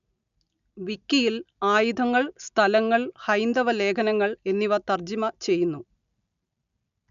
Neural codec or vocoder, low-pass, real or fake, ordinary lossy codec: none; 7.2 kHz; real; none